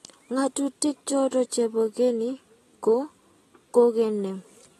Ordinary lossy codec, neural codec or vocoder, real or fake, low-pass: AAC, 32 kbps; none; real; 19.8 kHz